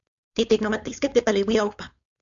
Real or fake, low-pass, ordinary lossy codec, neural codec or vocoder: fake; 7.2 kHz; MP3, 64 kbps; codec, 16 kHz, 4.8 kbps, FACodec